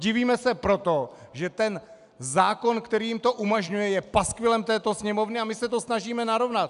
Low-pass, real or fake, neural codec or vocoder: 10.8 kHz; real; none